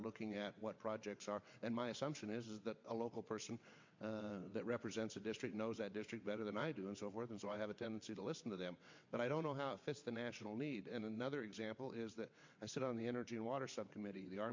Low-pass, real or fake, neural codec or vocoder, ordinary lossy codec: 7.2 kHz; fake; vocoder, 22.05 kHz, 80 mel bands, WaveNeXt; MP3, 48 kbps